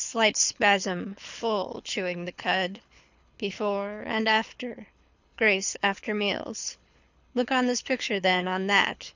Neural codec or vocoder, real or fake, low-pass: codec, 24 kHz, 6 kbps, HILCodec; fake; 7.2 kHz